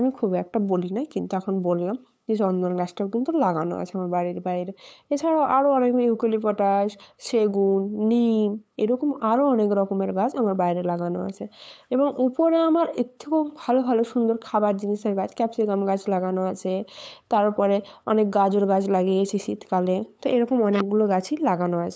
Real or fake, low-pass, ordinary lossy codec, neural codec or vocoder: fake; none; none; codec, 16 kHz, 8 kbps, FunCodec, trained on LibriTTS, 25 frames a second